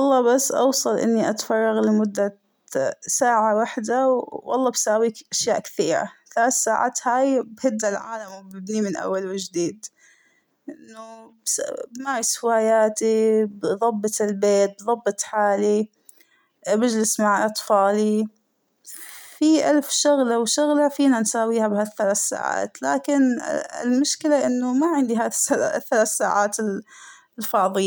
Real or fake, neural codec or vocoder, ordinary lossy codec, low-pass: real; none; none; none